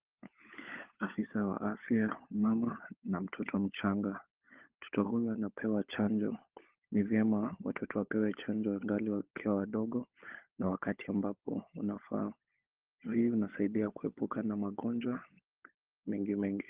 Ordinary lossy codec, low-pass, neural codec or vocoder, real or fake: Opus, 16 kbps; 3.6 kHz; codec, 16 kHz, 16 kbps, FunCodec, trained on LibriTTS, 50 frames a second; fake